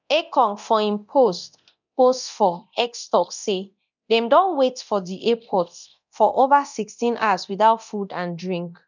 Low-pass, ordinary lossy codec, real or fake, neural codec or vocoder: 7.2 kHz; none; fake; codec, 24 kHz, 0.9 kbps, DualCodec